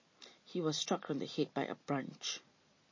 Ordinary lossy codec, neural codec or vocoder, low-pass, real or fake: MP3, 32 kbps; none; 7.2 kHz; real